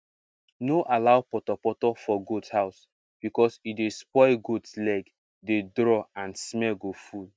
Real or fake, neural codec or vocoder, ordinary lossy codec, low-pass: real; none; none; none